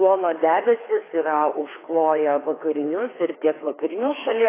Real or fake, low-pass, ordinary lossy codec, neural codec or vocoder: fake; 3.6 kHz; AAC, 16 kbps; codec, 16 kHz, 2 kbps, FreqCodec, larger model